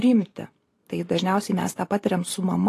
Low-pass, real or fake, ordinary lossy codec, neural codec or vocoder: 14.4 kHz; fake; AAC, 48 kbps; vocoder, 44.1 kHz, 128 mel bands every 512 samples, BigVGAN v2